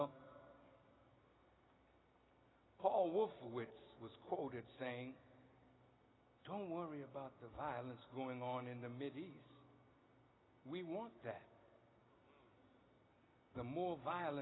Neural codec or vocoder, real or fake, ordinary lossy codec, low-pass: none; real; AAC, 16 kbps; 7.2 kHz